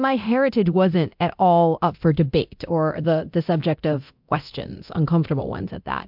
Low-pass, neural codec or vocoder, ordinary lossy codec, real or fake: 5.4 kHz; codec, 24 kHz, 0.9 kbps, DualCodec; MP3, 48 kbps; fake